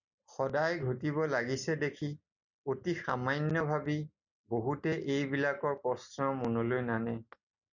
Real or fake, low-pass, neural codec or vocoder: real; 7.2 kHz; none